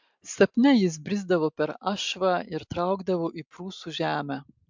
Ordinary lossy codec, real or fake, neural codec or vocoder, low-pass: MP3, 64 kbps; real; none; 7.2 kHz